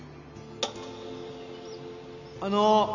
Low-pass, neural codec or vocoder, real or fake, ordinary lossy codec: 7.2 kHz; none; real; none